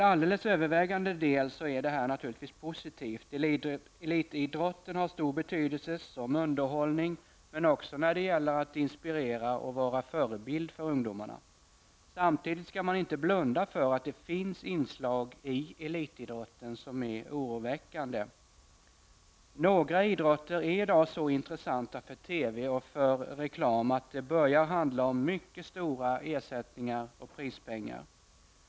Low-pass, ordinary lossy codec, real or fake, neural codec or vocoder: none; none; real; none